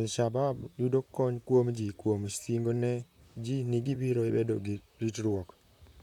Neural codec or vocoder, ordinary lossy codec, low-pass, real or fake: vocoder, 44.1 kHz, 128 mel bands, Pupu-Vocoder; none; 19.8 kHz; fake